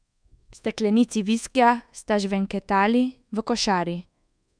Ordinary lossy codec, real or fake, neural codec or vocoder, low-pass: MP3, 96 kbps; fake; codec, 24 kHz, 1.2 kbps, DualCodec; 9.9 kHz